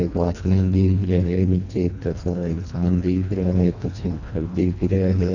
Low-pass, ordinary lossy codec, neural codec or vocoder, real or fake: 7.2 kHz; none; codec, 24 kHz, 1.5 kbps, HILCodec; fake